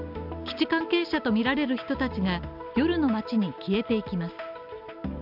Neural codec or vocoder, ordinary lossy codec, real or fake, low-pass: none; none; real; 5.4 kHz